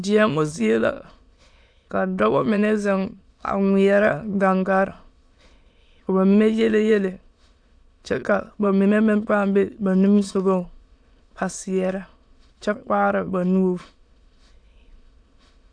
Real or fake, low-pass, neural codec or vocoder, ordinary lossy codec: fake; 9.9 kHz; autoencoder, 22.05 kHz, a latent of 192 numbers a frame, VITS, trained on many speakers; AAC, 64 kbps